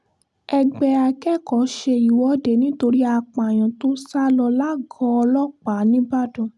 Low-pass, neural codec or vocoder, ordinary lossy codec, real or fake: none; none; none; real